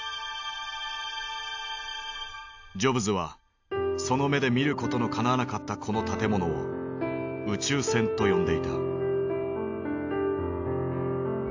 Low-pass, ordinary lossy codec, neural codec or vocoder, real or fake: 7.2 kHz; AAC, 48 kbps; none; real